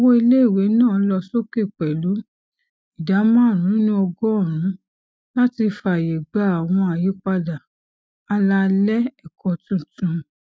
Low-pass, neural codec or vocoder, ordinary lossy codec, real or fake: none; none; none; real